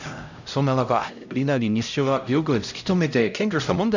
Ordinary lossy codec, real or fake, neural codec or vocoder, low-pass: none; fake; codec, 16 kHz, 0.5 kbps, X-Codec, HuBERT features, trained on LibriSpeech; 7.2 kHz